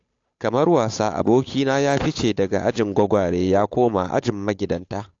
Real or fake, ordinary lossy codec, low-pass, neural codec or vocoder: fake; AAC, 48 kbps; 7.2 kHz; codec, 16 kHz, 8 kbps, FunCodec, trained on Chinese and English, 25 frames a second